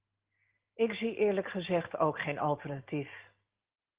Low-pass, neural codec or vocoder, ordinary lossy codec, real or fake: 3.6 kHz; none; Opus, 16 kbps; real